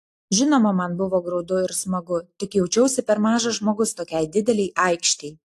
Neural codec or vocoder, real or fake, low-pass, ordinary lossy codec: none; real; 14.4 kHz; AAC, 64 kbps